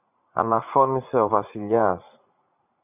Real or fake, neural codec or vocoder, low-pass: fake; vocoder, 44.1 kHz, 80 mel bands, Vocos; 3.6 kHz